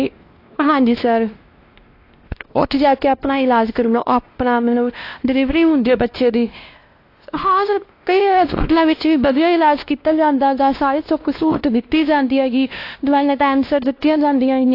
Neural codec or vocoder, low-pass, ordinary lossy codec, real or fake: codec, 16 kHz, 1 kbps, X-Codec, WavLM features, trained on Multilingual LibriSpeech; 5.4 kHz; AAC, 32 kbps; fake